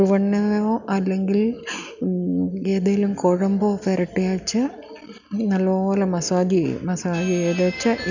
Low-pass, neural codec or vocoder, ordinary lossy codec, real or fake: 7.2 kHz; none; none; real